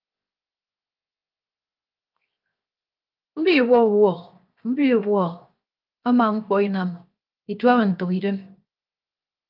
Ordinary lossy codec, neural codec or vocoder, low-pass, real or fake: Opus, 32 kbps; codec, 16 kHz, 0.7 kbps, FocalCodec; 5.4 kHz; fake